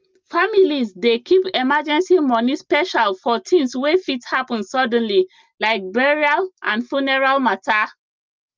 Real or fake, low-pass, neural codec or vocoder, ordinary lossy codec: real; 7.2 kHz; none; Opus, 24 kbps